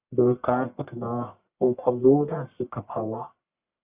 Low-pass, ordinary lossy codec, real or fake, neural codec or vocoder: 3.6 kHz; Opus, 64 kbps; fake; codec, 44.1 kHz, 1.7 kbps, Pupu-Codec